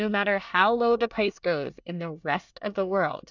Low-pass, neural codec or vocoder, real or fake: 7.2 kHz; codec, 24 kHz, 1 kbps, SNAC; fake